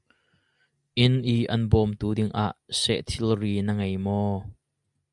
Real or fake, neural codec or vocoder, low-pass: real; none; 10.8 kHz